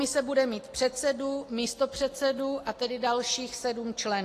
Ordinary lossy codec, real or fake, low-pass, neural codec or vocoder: AAC, 48 kbps; real; 14.4 kHz; none